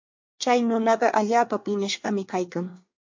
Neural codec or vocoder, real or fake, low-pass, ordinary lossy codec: codec, 24 kHz, 1 kbps, SNAC; fake; 7.2 kHz; MP3, 48 kbps